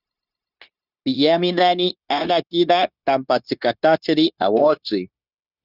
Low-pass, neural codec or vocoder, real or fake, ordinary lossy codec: 5.4 kHz; codec, 16 kHz, 0.9 kbps, LongCat-Audio-Codec; fake; Opus, 64 kbps